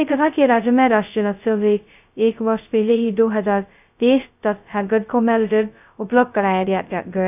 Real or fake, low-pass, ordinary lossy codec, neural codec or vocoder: fake; 3.6 kHz; none; codec, 16 kHz, 0.2 kbps, FocalCodec